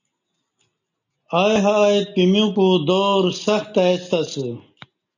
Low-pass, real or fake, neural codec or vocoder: 7.2 kHz; real; none